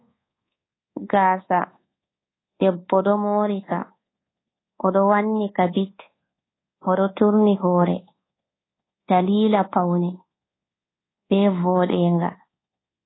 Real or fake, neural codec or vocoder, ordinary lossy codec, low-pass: fake; codec, 24 kHz, 1.2 kbps, DualCodec; AAC, 16 kbps; 7.2 kHz